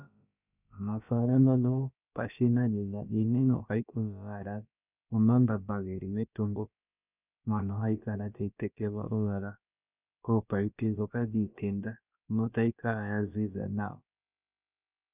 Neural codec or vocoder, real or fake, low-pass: codec, 16 kHz, about 1 kbps, DyCAST, with the encoder's durations; fake; 3.6 kHz